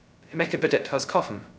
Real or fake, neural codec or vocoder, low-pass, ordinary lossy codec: fake; codec, 16 kHz, 0.2 kbps, FocalCodec; none; none